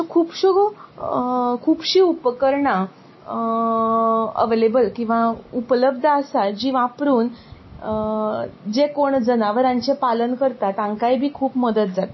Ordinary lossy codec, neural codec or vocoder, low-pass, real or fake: MP3, 24 kbps; none; 7.2 kHz; real